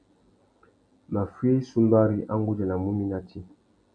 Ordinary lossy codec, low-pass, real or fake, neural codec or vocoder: MP3, 64 kbps; 9.9 kHz; real; none